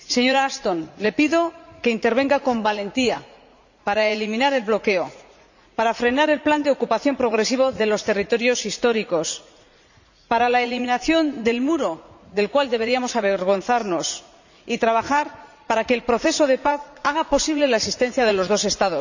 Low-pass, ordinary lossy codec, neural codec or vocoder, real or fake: 7.2 kHz; none; vocoder, 44.1 kHz, 80 mel bands, Vocos; fake